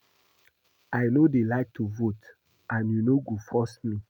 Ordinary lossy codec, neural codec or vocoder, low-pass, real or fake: none; none; 19.8 kHz; real